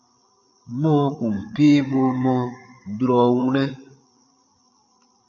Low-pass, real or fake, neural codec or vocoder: 7.2 kHz; fake; codec, 16 kHz, 8 kbps, FreqCodec, larger model